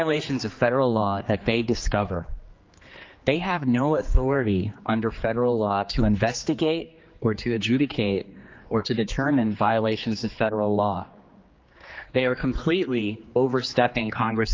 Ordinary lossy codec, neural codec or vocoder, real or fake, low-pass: Opus, 24 kbps; codec, 16 kHz, 2 kbps, X-Codec, HuBERT features, trained on general audio; fake; 7.2 kHz